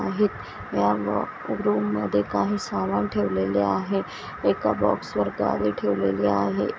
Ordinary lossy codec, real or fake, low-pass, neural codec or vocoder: none; real; none; none